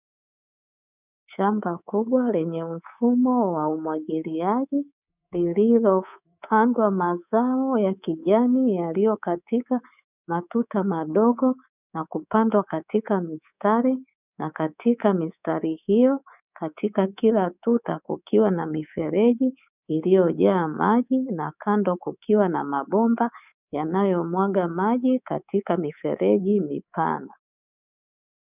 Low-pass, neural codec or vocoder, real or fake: 3.6 kHz; codec, 24 kHz, 3.1 kbps, DualCodec; fake